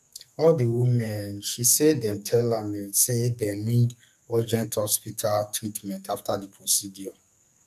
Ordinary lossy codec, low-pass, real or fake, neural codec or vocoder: none; 14.4 kHz; fake; codec, 44.1 kHz, 2.6 kbps, SNAC